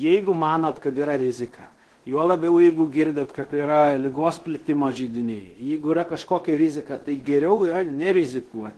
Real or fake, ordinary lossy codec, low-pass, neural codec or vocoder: fake; Opus, 16 kbps; 10.8 kHz; codec, 16 kHz in and 24 kHz out, 0.9 kbps, LongCat-Audio-Codec, fine tuned four codebook decoder